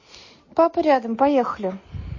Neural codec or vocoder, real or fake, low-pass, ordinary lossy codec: none; real; 7.2 kHz; MP3, 32 kbps